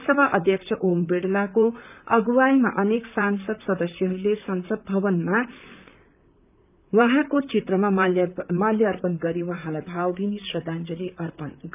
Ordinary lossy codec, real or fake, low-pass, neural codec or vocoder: none; fake; 3.6 kHz; vocoder, 44.1 kHz, 128 mel bands, Pupu-Vocoder